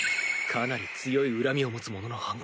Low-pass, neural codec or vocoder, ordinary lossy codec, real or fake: none; none; none; real